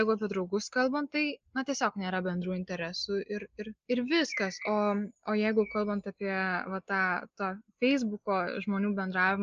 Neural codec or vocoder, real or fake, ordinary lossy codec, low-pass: none; real; Opus, 24 kbps; 7.2 kHz